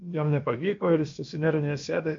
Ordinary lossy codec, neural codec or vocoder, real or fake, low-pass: MP3, 48 kbps; codec, 16 kHz, about 1 kbps, DyCAST, with the encoder's durations; fake; 7.2 kHz